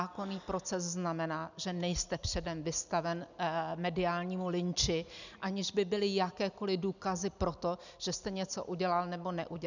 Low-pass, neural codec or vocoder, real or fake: 7.2 kHz; none; real